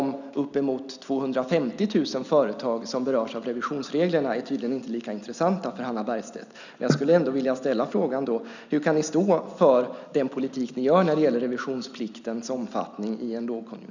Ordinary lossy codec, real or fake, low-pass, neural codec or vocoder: none; real; 7.2 kHz; none